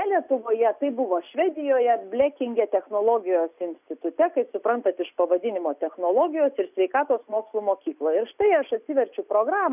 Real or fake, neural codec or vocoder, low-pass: real; none; 3.6 kHz